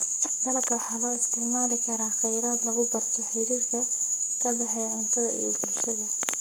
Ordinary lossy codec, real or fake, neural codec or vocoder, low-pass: none; fake; codec, 44.1 kHz, 7.8 kbps, Pupu-Codec; none